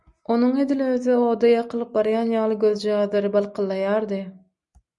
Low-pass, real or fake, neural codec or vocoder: 10.8 kHz; real; none